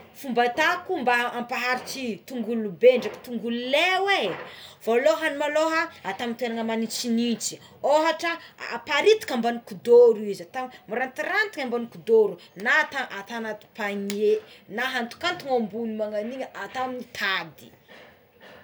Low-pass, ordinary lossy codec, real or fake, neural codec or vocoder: none; none; real; none